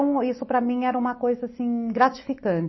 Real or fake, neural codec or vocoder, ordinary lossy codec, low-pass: real; none; MP3, 24 kbps; 7.2 kHz